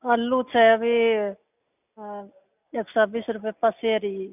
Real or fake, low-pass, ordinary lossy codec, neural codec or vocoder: real; 3.6 kHz; none; none